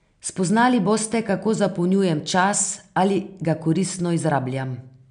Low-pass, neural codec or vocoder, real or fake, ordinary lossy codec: 9.9 kHz; none; real; none